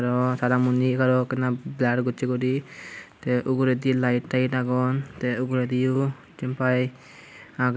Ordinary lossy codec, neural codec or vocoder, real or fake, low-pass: none; none; real; none